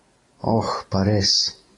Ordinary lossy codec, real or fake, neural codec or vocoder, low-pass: AAC, 32 kbps; real; none; 10.8 kHz